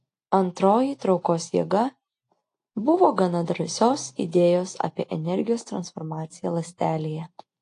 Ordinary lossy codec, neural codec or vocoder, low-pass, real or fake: AAC, 48 kbps; none; 10.8 kHz; real